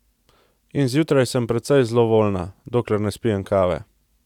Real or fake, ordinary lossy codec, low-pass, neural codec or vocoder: real; none; 19.8 kHz; none